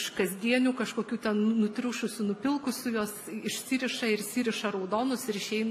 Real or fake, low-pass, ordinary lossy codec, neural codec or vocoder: real; 14.4 kHz; AAC, 64 kbps; none